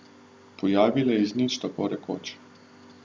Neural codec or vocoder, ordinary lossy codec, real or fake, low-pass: none; none; real; none